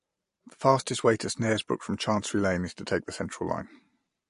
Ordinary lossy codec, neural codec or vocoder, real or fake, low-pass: MP3, 48 kbps; none; real; 10.8 kHz